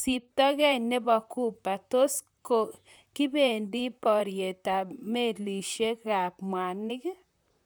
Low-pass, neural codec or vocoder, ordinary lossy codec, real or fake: none; vocoder, 44.1 kHz, 128 mel bands, Pupu-Vocoder; none; fake